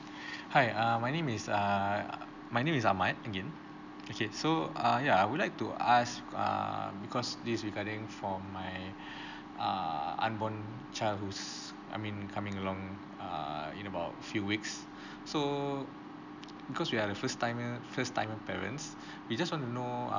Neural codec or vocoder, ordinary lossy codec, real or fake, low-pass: none; none; real; 7.2 kHz